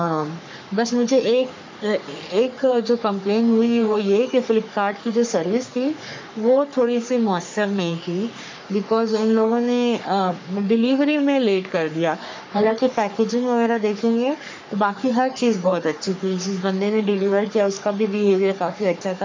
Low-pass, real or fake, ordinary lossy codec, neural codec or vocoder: 7.2 kHz; fake; MP3, 64 kbps; codec, 44.1 kHz, 3.4 kbps, Pupu-Codec